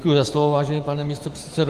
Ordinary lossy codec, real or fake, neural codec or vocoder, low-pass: Opus, 24 kbps; fake; autoencoder, 48 kHz, 128 numbers a frame, DAC-VAE, trained on Japanese speech; 14.4 kHz